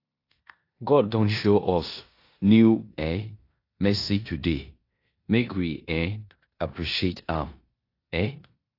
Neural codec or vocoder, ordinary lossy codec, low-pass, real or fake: codec, 16 kHz in and 24 kHz out, 0.9 kbps, LongCat-Audio-Codec, four codebook decoder; AAC, 32 kbps; 5.4 kHz; fake